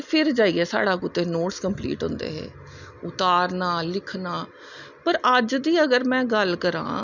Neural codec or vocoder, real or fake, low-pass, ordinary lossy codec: none; real; 7.2 kHz; none